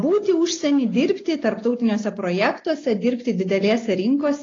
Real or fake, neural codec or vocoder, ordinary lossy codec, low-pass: real; none; AAC, 32 kbps; 7.2 kHz